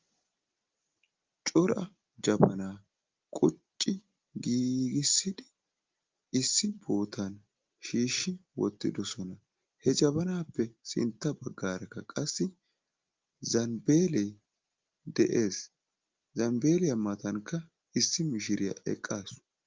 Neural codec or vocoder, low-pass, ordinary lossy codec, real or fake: none; 7.2 kHz; Opus, 32 kbps; real